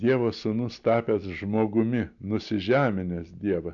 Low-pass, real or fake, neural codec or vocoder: 7.2 kHz; real; none